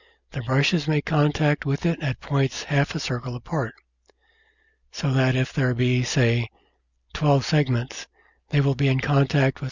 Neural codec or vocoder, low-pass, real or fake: none; 7.2 kHz; real